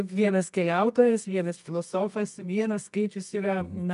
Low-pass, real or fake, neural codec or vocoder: 10.8 kHz; fake; codec, 24 kHz, 0.9 kbps, WavTokenizer, medium music audio release